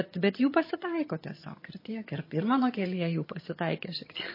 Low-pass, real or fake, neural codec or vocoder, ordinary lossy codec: 5.4 kHz; fake; vocoder, 22.05 kHz, 80 mel bands, HiFi-GAN; MP3, 24 kbps